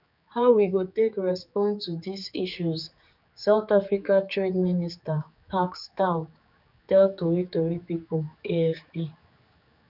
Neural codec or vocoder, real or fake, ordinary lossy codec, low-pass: codec, 16 kHz, 4 kbps, X-Codec, HuBERT features, trained on general audio; fake; none; 5.4 kHz